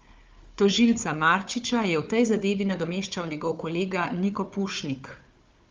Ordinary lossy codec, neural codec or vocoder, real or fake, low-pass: Opus, 32 kbps; codec, 16 kHz, 4 kbps, FunCodec, trained on Chinese and English, 50 frames a second; fake; 7.2 kHz